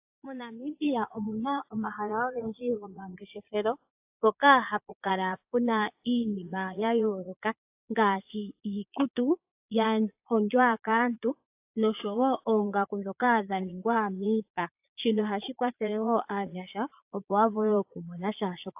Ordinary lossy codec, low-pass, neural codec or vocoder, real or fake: AAC, 32 kbps; 3.6 kHz; vocoder, 22.05 kHz, 80 mel bands, Vocos; fake